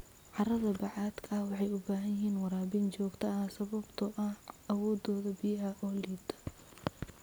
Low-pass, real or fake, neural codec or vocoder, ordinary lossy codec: none; real; none; none